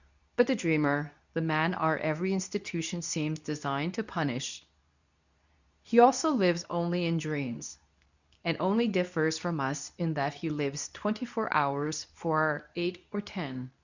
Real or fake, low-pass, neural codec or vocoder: fake; 7.2 kHz; codec, 24 kHz, 0.9 kbps, WavTokenizer, medium speech release version 2